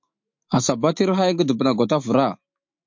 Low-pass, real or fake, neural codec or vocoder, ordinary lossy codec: 7.2 kHz; fake; autoencoder, 48 kHz, 128 numbers a frame, DAC-VAE, trained on Japanese speech; MP3, 48 kbps